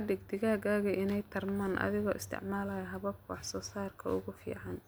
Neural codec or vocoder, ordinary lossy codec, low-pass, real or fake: none; none; none; real